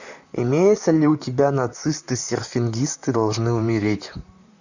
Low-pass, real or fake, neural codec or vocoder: 7.2 kHz; fake; vocoder, 44.1 kHz, 128 mel bands, Pupu-Vocoder